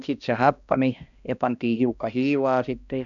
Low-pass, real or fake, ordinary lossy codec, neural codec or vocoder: 7.2 kHz; fake; none; codec, 16 kHz, 1 kbps, X-Codec, HuBERT features, trained on balanced general audio